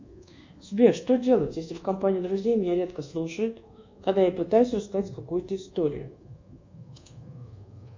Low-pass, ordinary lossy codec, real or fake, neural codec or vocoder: 7.2 kHz; MP3, 64 kbps; fake; codec, 24 kHz, 1.2 kbps, DualCodec